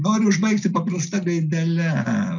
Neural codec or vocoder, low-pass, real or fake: none; 7.2 kHz; real